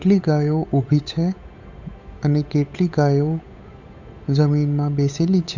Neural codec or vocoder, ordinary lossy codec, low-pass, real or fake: codec, 16 kHz, 8 kbps, FunCodec, trained on Chinese and English, 25 frames a second; none; 7.2 kHz; fake